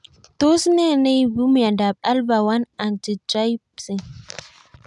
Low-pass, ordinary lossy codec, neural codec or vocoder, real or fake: 10.8 kHz; none; none; real